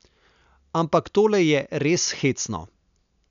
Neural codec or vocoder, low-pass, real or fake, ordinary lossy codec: none; 7.2 kHz; real; none